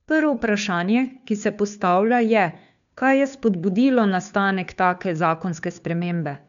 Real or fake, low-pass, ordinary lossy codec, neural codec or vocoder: fake; 7.2 kHz; none; codec, 16 kHz, 2 kbps, FunCodec, trained on Chinese and English, 25 frames a second